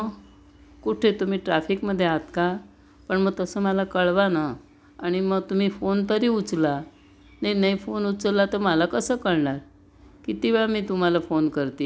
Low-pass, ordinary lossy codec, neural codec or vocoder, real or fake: none; none; none; real